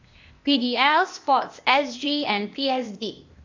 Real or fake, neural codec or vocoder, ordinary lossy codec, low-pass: fake; codec, 16 kHz, 0.8 kbps, ZipCodec; MP3, 64 kbps; 7.2 kHz